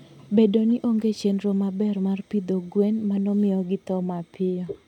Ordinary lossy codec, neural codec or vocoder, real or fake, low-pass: none; none; real; 19.8 kHz